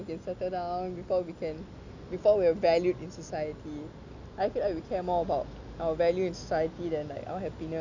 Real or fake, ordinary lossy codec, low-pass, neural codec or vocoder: real; none; 7.2 kHz; none